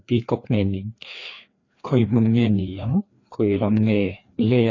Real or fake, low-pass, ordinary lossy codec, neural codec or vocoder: fake; 7.2 kHz; AAC, 32 kbps; codec, 16 kHz, 2 kbps, FreqCodec, larger model